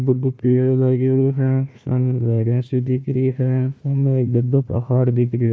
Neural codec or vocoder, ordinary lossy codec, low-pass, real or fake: codec, 16 kHz, 1 kbps, FunCodec, trained on Chinese and English, 50 frames a second; none; none; fake